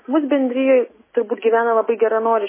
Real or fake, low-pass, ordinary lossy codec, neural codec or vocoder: real; 3.6 kHz; MP3, 16 kbps; none